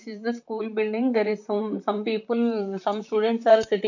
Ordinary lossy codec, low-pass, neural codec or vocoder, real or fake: none; 7.2 kHz; vocoder, 44.1 kHz, 128 mel bands, Pupu-Vocoder; fake